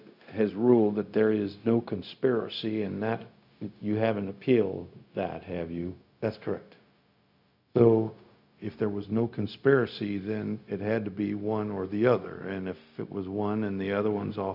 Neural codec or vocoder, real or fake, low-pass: codec, 16 kHz, 0.4 kbps, LongCat-Audio-Codec; fake; 5.4 kHz